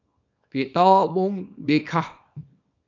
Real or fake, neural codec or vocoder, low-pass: fake; codec, 24 kHz, 0.9 kbps, WavTokenizer, small release; 7.2 kHz